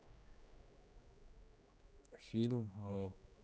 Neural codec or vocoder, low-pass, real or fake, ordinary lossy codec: codec, 16 kHz, 1 kbps, X-Codec, HuBERT features, trained on general audio; none; fake; none